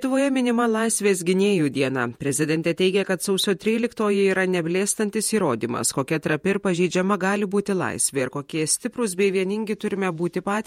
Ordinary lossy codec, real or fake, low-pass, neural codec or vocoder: MP3, 64 kbps; fake; 19.8 kHz; vocoder, 48 kHz, 128 mel bands, Vocos